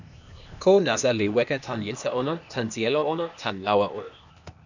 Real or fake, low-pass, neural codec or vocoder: fake; 7.2 kHz; codec, 16 kHz, 0.8 kbps, ZipCodec